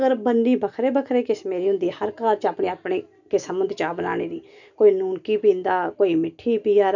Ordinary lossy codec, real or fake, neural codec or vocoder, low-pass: none; real; none; 7.2 kHz